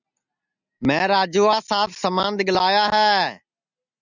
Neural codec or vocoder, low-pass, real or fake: none; 7.2 kHz; real